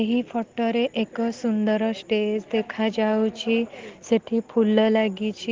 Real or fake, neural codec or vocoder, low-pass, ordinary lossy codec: real; none; 7.2 kHz; Opus, 16 kbps